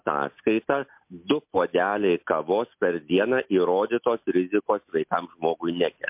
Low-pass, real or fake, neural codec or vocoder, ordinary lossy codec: 3.6 kHz; real; none; MP3, 32 kbps